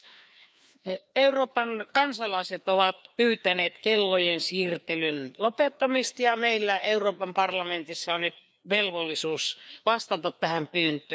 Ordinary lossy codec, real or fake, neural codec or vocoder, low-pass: none; fake; codec, 16 kHz, 2 kbps, FreqCodec, larger model; none